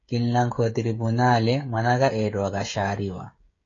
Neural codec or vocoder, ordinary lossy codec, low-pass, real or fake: codec, 16 kHz, 16 kbps, FreqCodec, smaller model; AAC, 32 kbps; 7.2 kHz; fake